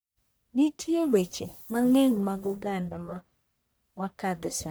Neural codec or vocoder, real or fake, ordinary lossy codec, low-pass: codec, 44.1 kHz, 1.7 kbps, Pupu-Codec; fake; none; none